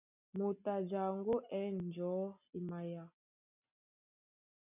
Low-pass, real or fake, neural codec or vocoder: 3.6 kHz; real; none